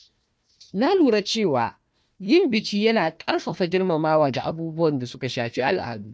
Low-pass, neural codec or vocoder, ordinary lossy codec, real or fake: none; codec, 16 kHz, 1 kbps, FunCodec, trained on Chinese and English, 50 frames a second; none; fake